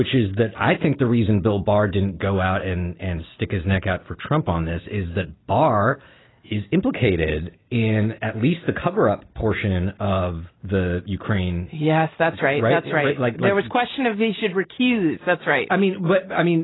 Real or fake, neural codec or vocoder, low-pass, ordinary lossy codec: real; none; 7.2 kHz; AAC, 16 kbps